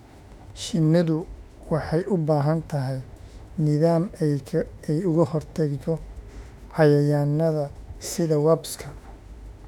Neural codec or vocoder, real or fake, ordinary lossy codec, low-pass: autoencoder, 48 kHz, 32 numbers a frame, DAC-VAE, trained on Japanese speech; fake; none; 19.8 kHz